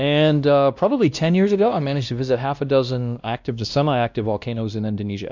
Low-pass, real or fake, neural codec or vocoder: 7.2 kHz; fake; codec, 16 kHz, 1 kbps, X-Codec, WavLM features, trained on Multilingual LibriSpeech